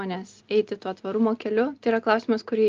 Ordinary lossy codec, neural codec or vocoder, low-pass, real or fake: Opus, 32 kbps; none; 7.2 kHz; real